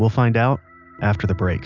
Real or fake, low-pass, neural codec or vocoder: real; 7.2 kHz; none